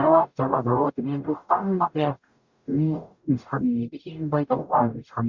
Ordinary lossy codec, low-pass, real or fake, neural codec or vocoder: Opus, 64 kbps; 7.2 kHz; fake; codec, 44.1 kHz, 0.9 kbps, DAC